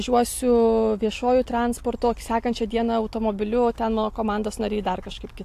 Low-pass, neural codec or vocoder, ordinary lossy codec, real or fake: 14.4 kHz; none; AAC, 64 kbps; real